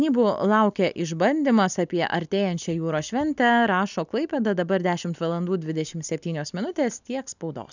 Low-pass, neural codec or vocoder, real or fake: 7.2 kHz; none; real